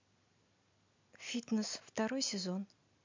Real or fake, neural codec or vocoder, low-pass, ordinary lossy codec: real; none; 7.2 kHz; MP3, 48 kbps